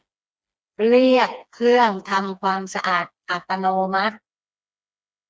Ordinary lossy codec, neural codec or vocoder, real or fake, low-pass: none; codec, 16 kHz, 2 kbps, FreqCodec, smaller model; fake; none